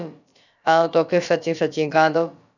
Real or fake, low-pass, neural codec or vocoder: fake; 7.2 kHz; codec, 16 kHz, about 1 kbps, DyCAST, with the encoder's durations